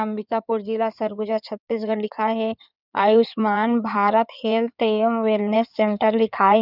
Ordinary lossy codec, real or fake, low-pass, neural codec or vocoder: none; fake; 5.4 kHz; codec, 16 kHz in and 24 kHz out, 2.2 kbps, FireRedTTS-2 codec